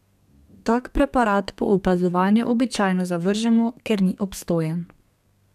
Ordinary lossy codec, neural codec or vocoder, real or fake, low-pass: none; codec, 32 kHz, 1.9 kbps, SNAC; fake; 14.4 kHz